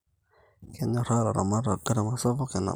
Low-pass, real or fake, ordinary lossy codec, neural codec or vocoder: none; real; none; none